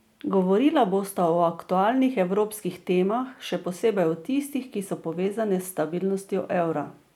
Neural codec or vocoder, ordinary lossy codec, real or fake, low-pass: none; none; real; 19.8 kHz